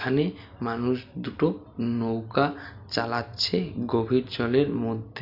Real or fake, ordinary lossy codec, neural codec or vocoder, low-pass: real; none; none; 5.4 kHz